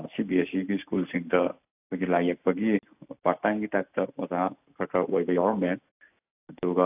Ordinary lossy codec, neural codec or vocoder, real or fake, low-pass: AAC, 32 kbps; none; real; 3.6 kHz